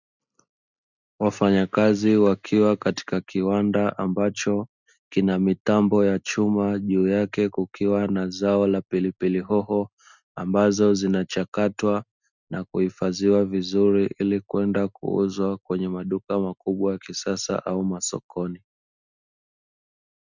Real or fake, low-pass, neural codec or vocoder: real; 7.2 kHz; none